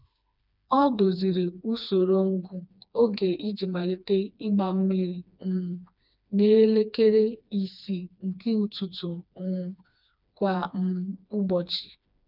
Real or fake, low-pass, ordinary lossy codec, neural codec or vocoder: fake; 5.4 kHz; none; codec, 16 kHz, 2 kbps, FreqCodec, smaller model